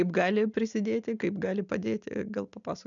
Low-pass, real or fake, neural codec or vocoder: 7.2 kHz; real; none